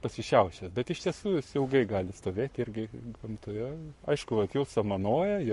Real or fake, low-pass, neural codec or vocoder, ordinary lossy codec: fake; 14.4 kHz; codec, 44.1 kHz, 7.8 kbps, Pupu-Codec; MP3, 48 kbps